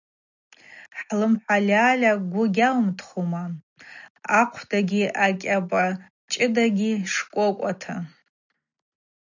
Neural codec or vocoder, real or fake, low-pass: none; real; 7.2 kHz